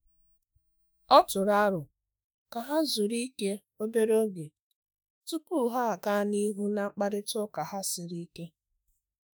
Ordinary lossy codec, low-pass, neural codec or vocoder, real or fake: none; none; autoencoder, 48 kHz, 32 numbers a frame, DAC-VAE, trained on Japanese speech; fake